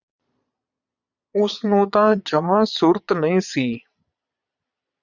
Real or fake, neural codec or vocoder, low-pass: fake; vocoder, 22.05 kHz, 80 mel bands, Vocos; 7.2 kHz